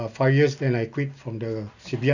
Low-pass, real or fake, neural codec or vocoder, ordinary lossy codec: 7.2 kHz; real; none; none